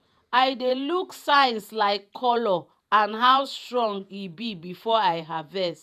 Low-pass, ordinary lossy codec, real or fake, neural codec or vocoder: 14.4 kHz; none; fake; vocoder, 48 kHz, 128 mel bands, Vocos